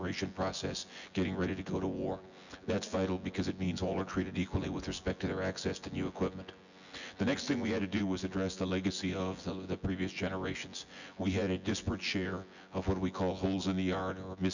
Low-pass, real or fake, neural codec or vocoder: 7.2 kHz; fake; vocoder, 24 kHz, 100 mel bands, Vocos